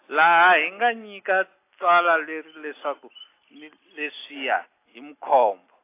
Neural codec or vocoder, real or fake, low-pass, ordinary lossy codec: none; real; 3.6 kHz; AAC, 24 kbps